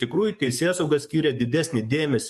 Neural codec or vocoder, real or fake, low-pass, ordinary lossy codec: codec, 44.1 kHz, 7.8 kbps, DAC; fake; 14.4 kHz; MP3, 64 kbps